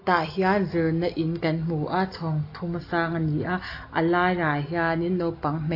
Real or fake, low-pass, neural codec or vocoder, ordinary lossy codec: real; 5.4 kHz; none; AAC, 32 kbps